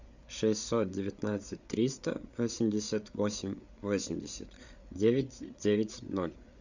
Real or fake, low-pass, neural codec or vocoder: fake; 7.2 kHz; codec, 16 kHz, 8 kbps, FreqCodec, larger model